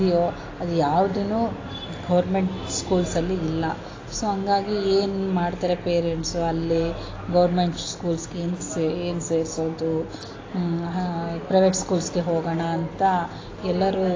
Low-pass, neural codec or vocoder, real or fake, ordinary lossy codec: 7.2 kHz; none; real; AAC, 32 kbps